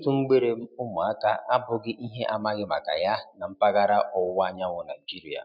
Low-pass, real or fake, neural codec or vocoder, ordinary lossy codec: 5.4 kHz; real; none; none